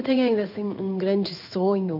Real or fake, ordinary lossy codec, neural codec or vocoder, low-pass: fake; none; codec, 16 kHz in and 24 kHz out, 1 kbps, XY-Tokenizer; 5.4 kHz